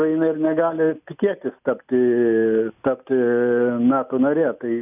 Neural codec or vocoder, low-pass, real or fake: none; 3.6 kHz; real